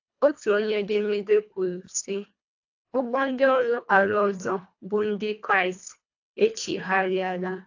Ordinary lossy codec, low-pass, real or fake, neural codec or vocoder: AAC, 48 kbps; 7.2 kHz; fake; codec, 24 kHz, 1.5 kbps, HILCodec